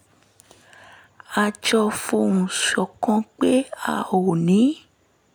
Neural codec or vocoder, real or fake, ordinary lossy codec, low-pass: none; real; none; none